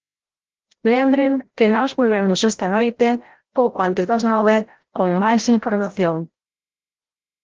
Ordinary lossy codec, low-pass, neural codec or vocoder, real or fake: Opus, 16 kbps; 7.2 kHz; codec, 16 kHz, 0.5 kbps, FreqCodec, larger model; fake